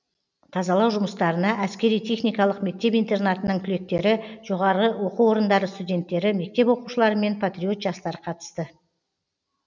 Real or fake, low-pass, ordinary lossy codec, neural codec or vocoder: real; 7.2 kHz; none; none